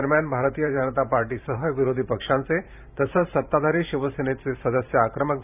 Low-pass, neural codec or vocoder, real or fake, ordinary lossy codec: 3.6 kHz; vocoder, 44.1 kHz, 128 mel bands every 512 samples, BigVGAN v2; fake; none